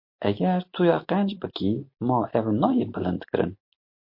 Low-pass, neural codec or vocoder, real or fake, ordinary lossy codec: 5.4 kHz; none; real; MP3, 32 kbps